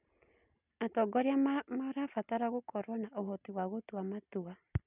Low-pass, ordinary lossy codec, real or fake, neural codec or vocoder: 3.6 kHz; none; real; none